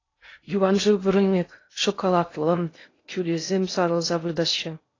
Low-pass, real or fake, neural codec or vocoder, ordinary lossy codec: 7.2 kHz; fake; codec, 16 kHz in and 24 kHz out, 0.6 kbps, FocalCodec, streaming, 2048 codes; AAC, 32 kbps